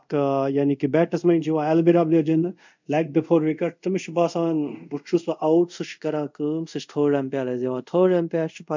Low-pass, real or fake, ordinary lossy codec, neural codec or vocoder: 7.2 kHz; fake; MP3, 64 kbps; codec, 24 kHz, 0.5 kbps, DualCodec